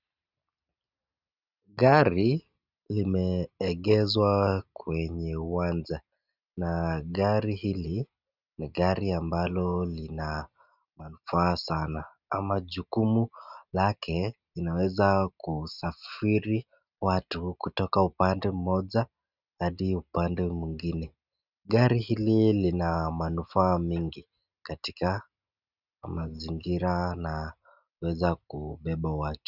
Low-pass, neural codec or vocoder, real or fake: 5.4 kHz; none; real